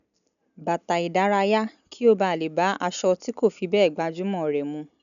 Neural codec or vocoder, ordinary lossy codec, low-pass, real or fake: none; none; 7.2 kHz; real